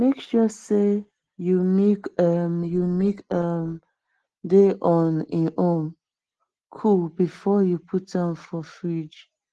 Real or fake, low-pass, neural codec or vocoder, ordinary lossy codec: real; 10.8 kHz; none; Opus, 16 kbps